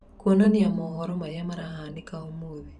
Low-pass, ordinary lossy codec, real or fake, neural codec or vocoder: 10.8 kHz; none; fake; vocoder, 44.1 kHz, 128 mel bands every 512 samples, BigVGAN v2